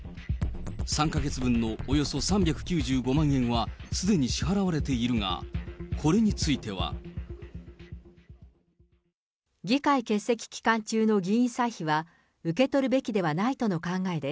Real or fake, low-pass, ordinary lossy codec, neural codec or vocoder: real; none; none; none